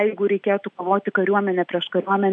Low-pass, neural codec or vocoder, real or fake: 9.9 kHz; none; real